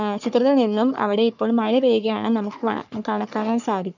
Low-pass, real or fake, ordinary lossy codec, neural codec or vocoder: 7.2 kHz; fake; none; codec, 44.1 kHz, 3.4 kbps, Pupu-Codec